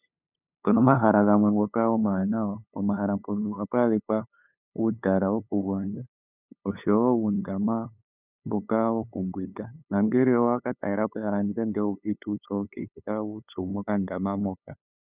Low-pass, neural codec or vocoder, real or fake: 3.6 kHz; codec, 16 kHz, 8 kbps, FunCodec, trained on LibriTTS, 25 frames a second; fake